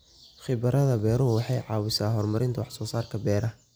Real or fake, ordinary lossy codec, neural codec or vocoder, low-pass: real; none; none; none